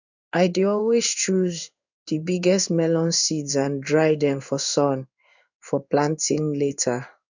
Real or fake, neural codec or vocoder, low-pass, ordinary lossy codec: fake; codec, 16 kHz in and 24 kHz out, 1 kbps, XY-Tokenizer; 7.2 kHz; none